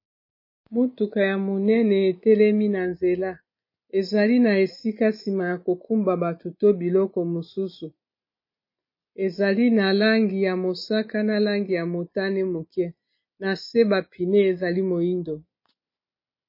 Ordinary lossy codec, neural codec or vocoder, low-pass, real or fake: MP3, 24 kbps; none; 5.4 kHz; real